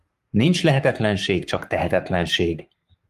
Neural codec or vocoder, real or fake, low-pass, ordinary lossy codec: codec, 44.1 kHz, 7.8 kbps, Pupu-Codec; fake; 14.4 kHz; Opus, 32 kbps